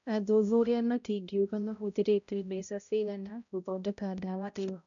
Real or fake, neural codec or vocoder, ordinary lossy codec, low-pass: fake; codec, 16 kHz, 0.5 kbps, X-Codec, HuBERT features, trained on balanced general audio; none; 7.2 kHz